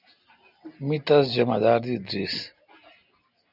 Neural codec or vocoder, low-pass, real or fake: none; 5.4 kHz; real